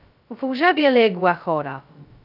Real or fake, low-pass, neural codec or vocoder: fake; 5.4 kHz; codec, 16 kHz, 0.2 kbps, FocalCodec